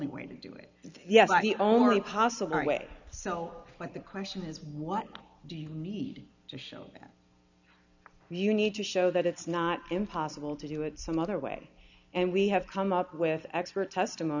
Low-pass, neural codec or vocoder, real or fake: 7.2 kHz; none; real